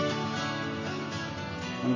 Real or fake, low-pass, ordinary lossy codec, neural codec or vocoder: real; 7.2 kHz; AAC, 48 kbps; none